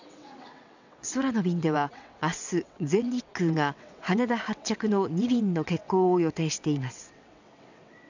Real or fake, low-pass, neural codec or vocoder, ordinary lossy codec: fake; 7.2 kHz; vocoder, 22.05 kHz, 80 mel bands, WaveNeXt; none